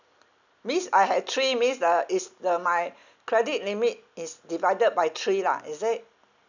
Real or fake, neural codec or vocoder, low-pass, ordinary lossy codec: real; none; 7.2 kHz; none